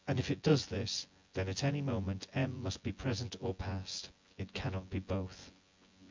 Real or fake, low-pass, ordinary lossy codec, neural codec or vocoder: fake; 7.2 kHz; MP3, 64 kbps; vocoder, 24 kHz, 100 mel bands, Vocos